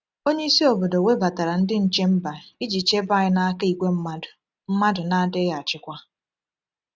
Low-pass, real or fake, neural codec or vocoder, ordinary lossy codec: none; real; none; none